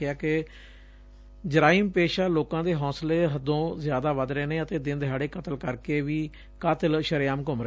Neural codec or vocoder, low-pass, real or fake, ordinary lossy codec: none; none; real; none